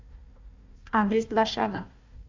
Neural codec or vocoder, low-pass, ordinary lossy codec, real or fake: codec, 16 kHz, 1 kbps, FunCodec, trained on Chinese and English, 50 frames a second; 7.2 kHz; MP3, 64 kbps; fake